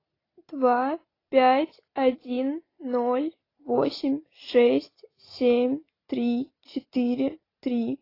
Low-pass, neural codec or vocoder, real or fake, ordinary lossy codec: 5.4 kHz; none; real; AAC, 24 kbps